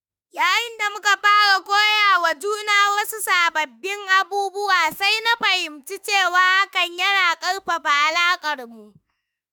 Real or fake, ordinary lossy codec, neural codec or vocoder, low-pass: fake; none; autoencoder, 48 kHz, 32 numbers a frame, DAC-VAE, trained on Japanese speech; none